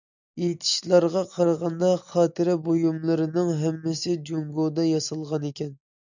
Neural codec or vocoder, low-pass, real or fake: none; 7.2 kHz; real